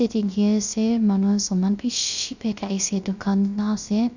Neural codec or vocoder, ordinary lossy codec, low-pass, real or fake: codec, 16 kHz, 0.3 kbps, FocalCodec; none; 7.2 kHz; fake